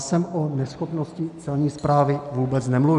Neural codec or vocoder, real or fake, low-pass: none; real; 10.8 kHz